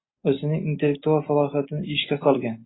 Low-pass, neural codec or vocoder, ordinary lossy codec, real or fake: 7.2 kHz; none; AAC, 16 kbps; real